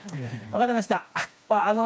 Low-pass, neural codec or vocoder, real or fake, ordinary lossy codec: none; codec, 16 kHz, 2 kbps, FreqCodec, smaller model; fake; none